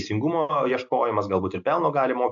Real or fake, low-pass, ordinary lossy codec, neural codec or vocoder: real; 7.2 kHz; AAC, 64 kbps; none